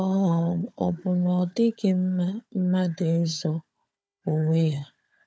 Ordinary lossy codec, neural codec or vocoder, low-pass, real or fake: none; codec, 16 kHz, 4 kbps, FunCodec, trained on Chinese and English, 50 frames a second; none; fake